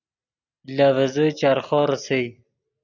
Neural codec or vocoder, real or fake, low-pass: none; real; 7.2 kHz